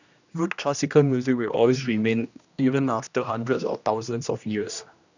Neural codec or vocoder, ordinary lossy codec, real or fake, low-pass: codec, 16 kHz, 1 kbps, X-Codec, HuBERT features, trained on general audio; none; fake; 7.2 kHz